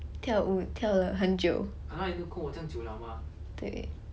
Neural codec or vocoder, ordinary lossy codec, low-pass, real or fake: none; none; none; real